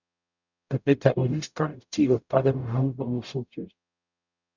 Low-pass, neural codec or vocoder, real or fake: 7.2 kHz; codec, 44.1 kHz, 0.9 kbps, DAC; fake